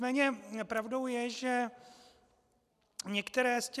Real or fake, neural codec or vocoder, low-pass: real; none; 14.4 kHz